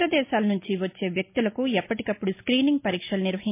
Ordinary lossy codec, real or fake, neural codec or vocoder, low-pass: MP3, 24 kbps; real; none; 3.6 kHz